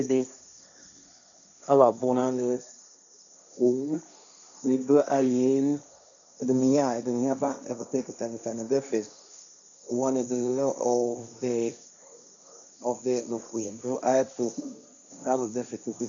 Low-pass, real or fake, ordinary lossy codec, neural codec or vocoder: 7.2 kHz; fake; MP3, 96 kbps; codec, 16 kHz, 1.1 kbps, Voila-Tokenizer